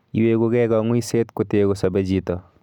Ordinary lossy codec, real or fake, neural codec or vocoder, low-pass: none; real; none; 19.8 kHz